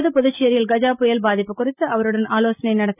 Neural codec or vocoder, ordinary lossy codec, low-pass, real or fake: none; none; 3.6 kHz; real